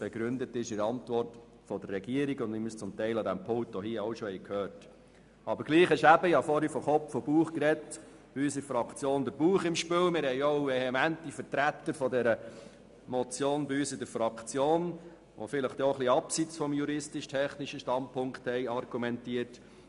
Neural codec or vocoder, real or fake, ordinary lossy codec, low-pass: none; real; MP3, 96 kbps; 10.8 kHz